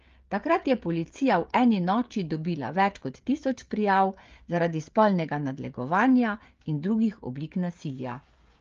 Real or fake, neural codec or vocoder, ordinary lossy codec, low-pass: fake; codec, 16 kHz, 16 kbps, FreqCodec, smaller model; Opus, 32 kbps; 7.2 kHz